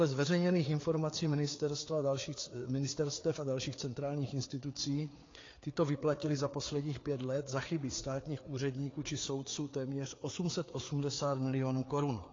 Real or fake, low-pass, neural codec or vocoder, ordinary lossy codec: fake; 7.2 kHz; codec, 16 kHz, 4 kbps, FreqCodec, larger model; AAC, 32 kbps